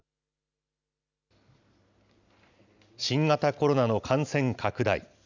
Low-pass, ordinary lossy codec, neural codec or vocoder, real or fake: 7.2 kHz; none; none; real